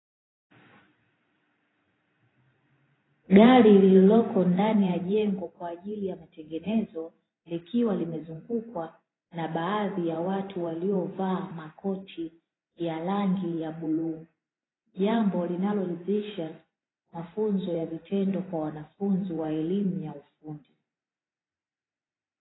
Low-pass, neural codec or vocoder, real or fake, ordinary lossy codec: 7.2 kHz; vocoder, 44.1 kHz, 128 mel bands every 512 samples, BigVGAN v2; fake; AAC, 16 kbps